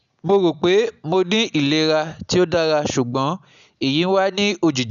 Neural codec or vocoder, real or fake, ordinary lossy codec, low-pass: none; real; none; 7.2 kHz